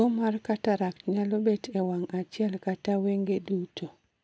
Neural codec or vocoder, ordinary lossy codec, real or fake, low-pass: none; none; real; none